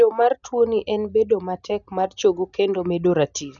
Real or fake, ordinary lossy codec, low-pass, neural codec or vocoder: real; none; 7.2 kHz; none